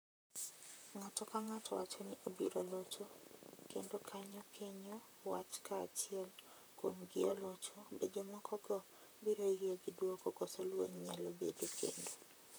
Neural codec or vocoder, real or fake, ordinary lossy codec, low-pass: vocoder, 44.1 kHz, 128 mel bands, Pupu-Vocoder; fake; none; none